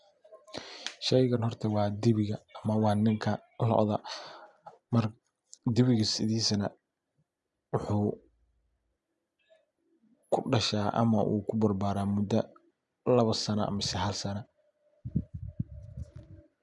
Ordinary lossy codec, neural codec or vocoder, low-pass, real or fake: none; none; 10.8 kHz; real